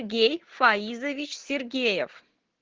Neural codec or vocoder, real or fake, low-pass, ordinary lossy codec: none; real; 7.2 kHz; Opus, 16 kbps